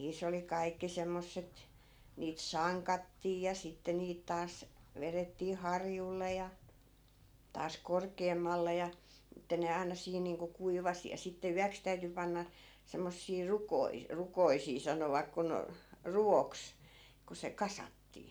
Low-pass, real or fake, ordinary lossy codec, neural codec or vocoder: none; real; none; none